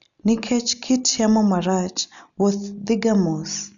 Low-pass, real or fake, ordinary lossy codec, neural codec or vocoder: 7.2 kHz; real; none; none